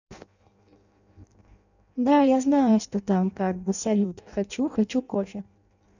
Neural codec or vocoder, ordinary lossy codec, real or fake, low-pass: codec, 16 kHz in and 24 kHz out, 0.6 kbps, FireRedTTS-2 codec; none; fake; 7.2 kHz